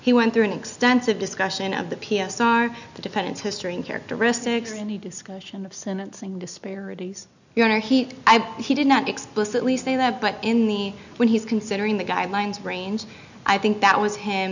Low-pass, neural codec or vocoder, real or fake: 7.2 kHz; none; real